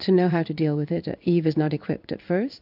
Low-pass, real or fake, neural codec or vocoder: 5.4 kHz; fake; codec, 16 kHz in and 24 kHz out, 1 kbps, XY-Tokenizer